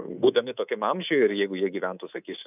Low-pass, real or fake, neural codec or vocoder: 3.6 kHz; fake; codec, 24 kHz, 3.1 kbps, DualCodec